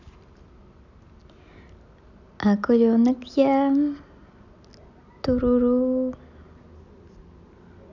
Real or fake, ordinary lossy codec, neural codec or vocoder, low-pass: real; none; none; 7.2 kHz